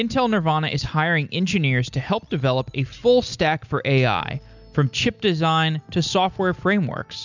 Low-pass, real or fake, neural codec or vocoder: 7.2 kHz; real; none